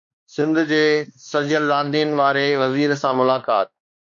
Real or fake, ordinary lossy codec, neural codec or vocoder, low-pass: fake; MP3, 48 kbps; codec, 16 kHz, 2 kbps, X-Codec, WavLM features, trained on Multilingual LibriSpeech; 7.2 kHz